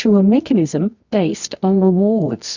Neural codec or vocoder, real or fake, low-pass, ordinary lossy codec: codec, 24 kHz, 0.9 kbps, WavTokenizer, medium music audio release; fake; 7.2 kHz; Opus, 64 kbps